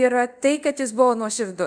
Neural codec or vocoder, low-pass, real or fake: codec, 24 kHz, 0.5 kbps, DualCodec; 9.9 kHz; fake